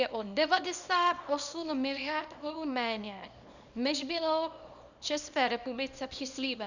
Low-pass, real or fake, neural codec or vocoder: 7.2 kHz; fake; codec, 24 kHz, 0.9 kbps, WavTokenizer, small release